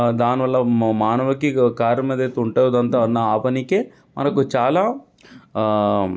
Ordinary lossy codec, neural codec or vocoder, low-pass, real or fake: none; none; none; real